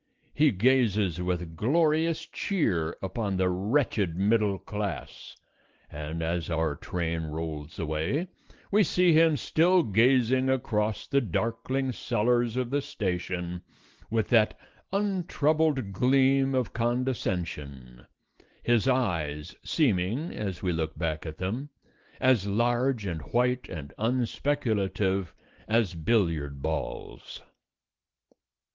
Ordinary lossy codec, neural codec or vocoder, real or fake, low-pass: Opus, 16 kbps; none; real; 7.2 kHz